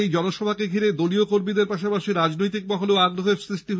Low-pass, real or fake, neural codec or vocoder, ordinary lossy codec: none; real; none; none